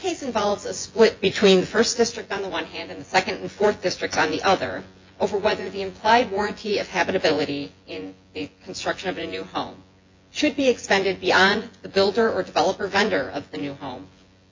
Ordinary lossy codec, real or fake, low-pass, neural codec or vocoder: MP3, 48 kbps; fake; 7.2 kHz; vocoder, 24 kHz, 100 mel bands, Vocos